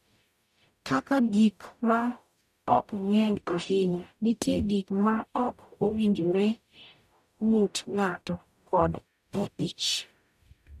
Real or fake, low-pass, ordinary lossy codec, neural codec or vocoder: fake; 14.4 kHz; none; codec, 44.1 kHz, 0.9 kbps, DAC